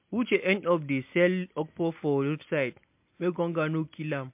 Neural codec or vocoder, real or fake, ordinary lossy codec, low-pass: none; real; MP3, 32 kbps; 3.6 kHz